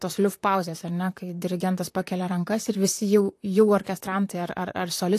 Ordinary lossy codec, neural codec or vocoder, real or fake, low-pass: AAC, 64 kbps; vocoder, 44.1 kHz, 128 mel bands, Pupu-Vocoder; fake; 14.4 kHz